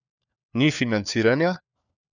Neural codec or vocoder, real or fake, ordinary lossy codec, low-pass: codec, 16 kHz, 4 kbps, FunCodec, trained on LibriTTS, 50 frames a second; fake; none; 7.2 kHz